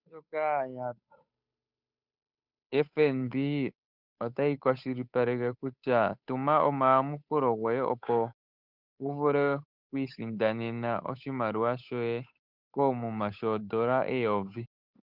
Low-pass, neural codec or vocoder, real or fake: 5.4 kHz; codec, 16 kHz, 8 kbps, FunCodec, trained on Chinese and English, 25 frames a second; fake